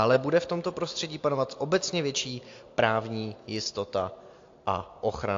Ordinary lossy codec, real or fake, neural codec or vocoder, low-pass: AAC, 48 kbps; real; none; 7.2 kHz